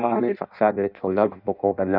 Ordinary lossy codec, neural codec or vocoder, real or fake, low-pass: none; codec, 16 kHz in and 24 kHz out, 0.6 kbps, FireRedTTS-2 codec; fake; 5.4 kHz